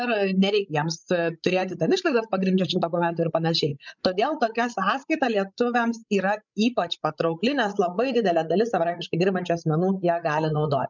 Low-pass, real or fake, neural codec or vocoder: 7.2 kHz; fake; codec, 16 kHz, 16 kbps, FreqCodec, larger model